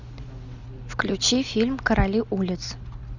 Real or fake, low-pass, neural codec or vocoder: real; 7.2 kHz; none